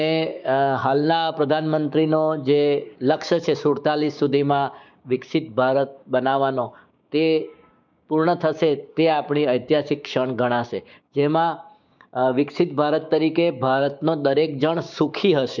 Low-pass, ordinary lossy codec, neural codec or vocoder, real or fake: 7.2 kHz; none; codec, 16 kHz, 6 kbps, DAC; fake